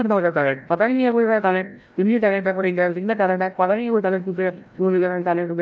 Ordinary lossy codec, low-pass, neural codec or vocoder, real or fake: none; none; codec, 16 kHz, 0.5 kbps, FreqCodec, larger model; fake